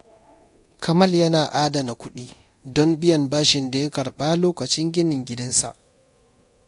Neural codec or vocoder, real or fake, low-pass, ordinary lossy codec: codec, 24 kHz, 0.9 kbps, DualCodec; fake; 10.8 kHz; AAC, 48 kbps